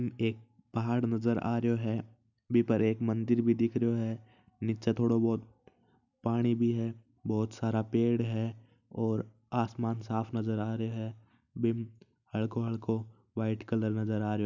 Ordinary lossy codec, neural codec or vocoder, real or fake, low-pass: none; none; real; 7.2 kHz